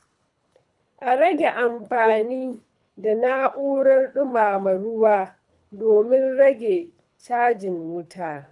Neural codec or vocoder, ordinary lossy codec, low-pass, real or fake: codec, 24 kHz, 3 kbps, HILCodec; none; 10.8 kHz; fake